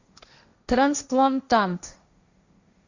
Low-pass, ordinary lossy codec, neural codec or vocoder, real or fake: 7.2 kHz; Opus, 64 kbps; codec, 16 kHz, 1.1 kbps, Voila-Tokenizer; fake